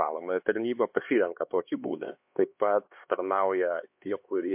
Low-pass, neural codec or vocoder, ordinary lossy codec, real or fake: 3.6 kHz; codec, 16 kHz, 2 kbps, X-Codec, HuBERT features, trained on LibriSpeech; MP3, 32 kbps; fake